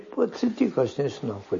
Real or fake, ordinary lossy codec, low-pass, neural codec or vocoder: real; MP3, 32 kbps; 7.2 kHz; none